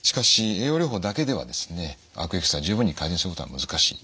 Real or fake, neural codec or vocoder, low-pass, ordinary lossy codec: real; none; none; none